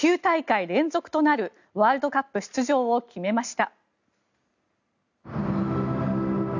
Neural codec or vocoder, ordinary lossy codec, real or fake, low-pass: none; none; real; 7.2 kHz